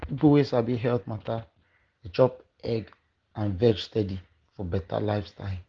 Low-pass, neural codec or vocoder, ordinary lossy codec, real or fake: 7.2 kHz; none; Opus, 16 kbps; real